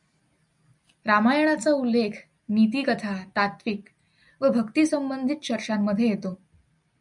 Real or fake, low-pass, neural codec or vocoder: real; 10.8 kHz; none